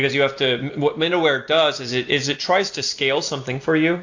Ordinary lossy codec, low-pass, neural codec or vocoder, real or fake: AAC, 48 kbps; 7.2 kHz; none; real